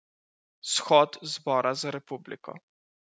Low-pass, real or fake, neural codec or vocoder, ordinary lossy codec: none; real; none; none